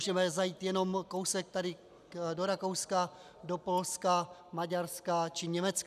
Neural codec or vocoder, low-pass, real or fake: none; 14.4 kHz; real